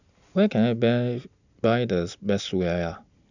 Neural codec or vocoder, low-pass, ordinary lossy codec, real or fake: none; 7.2 kHz; none; real